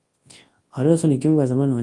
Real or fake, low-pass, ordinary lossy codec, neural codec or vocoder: fake; 10.8 kHz; Opus, 24 kbps; codec, 24 kHz, 0.9 kbps, WavTokenizer, large speech release